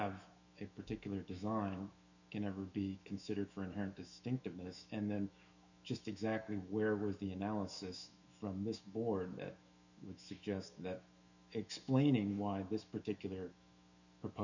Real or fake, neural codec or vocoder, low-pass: fake; autoencoder, 48 kHz, 128 numbers a frame, DAC-VAE, trained on Japanese speech; 7.2 kHz